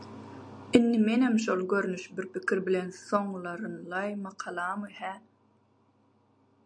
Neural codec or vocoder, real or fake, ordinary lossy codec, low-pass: none; real; MP3, 96 kbps; 9.9 kHz